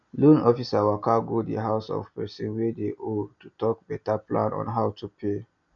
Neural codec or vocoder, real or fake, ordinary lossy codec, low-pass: none; real; none; 7.2 kHz